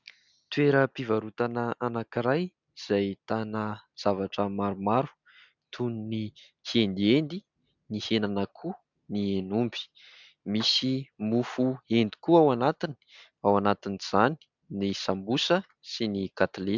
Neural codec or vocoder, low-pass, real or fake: none; 7.2 kHz; real